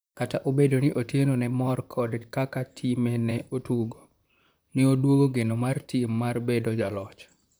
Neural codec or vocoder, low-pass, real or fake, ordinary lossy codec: vocoder, 44.1 kHz, 128 mel bands, Pupu-Vocoder; none; fake; none